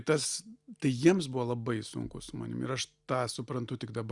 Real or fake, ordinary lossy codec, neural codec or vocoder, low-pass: real; Opus, 64 kbps; none; 10.8 kHz